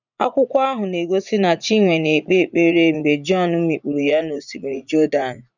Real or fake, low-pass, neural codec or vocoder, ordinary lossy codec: fake; 7.2 kHz; vocoder, 44.1 kHz, 80 mel bands, Vocos; none